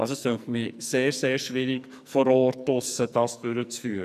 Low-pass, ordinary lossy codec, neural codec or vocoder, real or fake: 14.4 kHz; none; codec, 44.1 kHz, 2.6 kbps, SNAC; fake